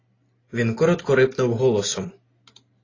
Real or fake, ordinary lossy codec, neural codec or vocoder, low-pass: real; AAC, 32 kbps; none; 7.2 kHz